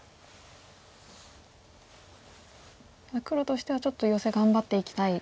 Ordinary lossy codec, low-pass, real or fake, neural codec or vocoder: none; none; real; none